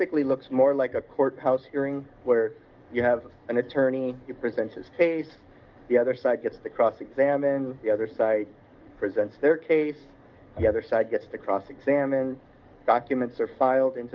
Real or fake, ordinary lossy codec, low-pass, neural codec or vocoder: fake; Opus, 32 kbps; 7.2 kHz; codec, 44.1 kHz, 7.8 kbps, DAC